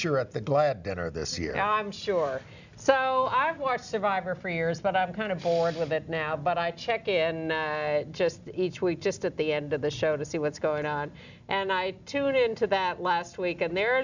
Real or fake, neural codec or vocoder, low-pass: real; none; 7.2 kHz